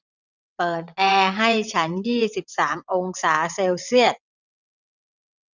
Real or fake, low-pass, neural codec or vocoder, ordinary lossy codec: fake; 7.2 kHz; vocoder, 22.05 kHz, 80 mel bands, WaveNeXt; none